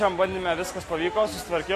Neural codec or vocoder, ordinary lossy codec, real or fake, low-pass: none; AAC, 48 kbps; real; 14.4 kHz